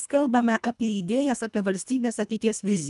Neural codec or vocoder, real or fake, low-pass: codec, 24 kHz, 1.5 kbps, HILCodec; fake; 10.8 kHz